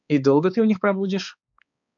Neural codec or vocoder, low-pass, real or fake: codec, 16 kHz, 2 kbps, X-Codec, HuBERT features, trained on balanced general audio; 7.2 kHz; fake